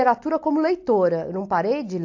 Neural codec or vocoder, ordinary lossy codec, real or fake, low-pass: none; AAC, 48 kbps; real; 7.2 kHz